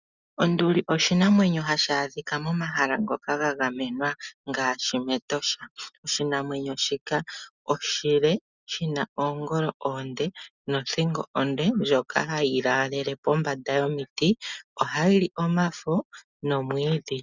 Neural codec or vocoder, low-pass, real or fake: none; 7.2 kHz; real